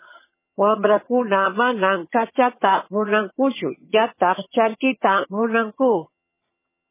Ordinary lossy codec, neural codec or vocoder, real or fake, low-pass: MP3, 16 kbps; vocoder, 22.05 kHz, 80 mel bands, HiFi-GAN; fake; 3.6 kHz